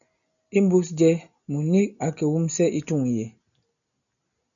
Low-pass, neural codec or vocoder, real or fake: 7.2 kHz; none; real